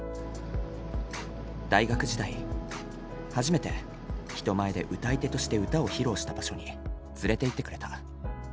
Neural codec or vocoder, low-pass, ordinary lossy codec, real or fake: none; none; none; real